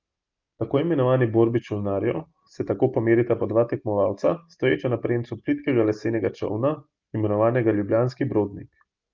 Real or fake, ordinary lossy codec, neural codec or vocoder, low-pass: real; Opus, 24 kbps; none; 7.2 kHz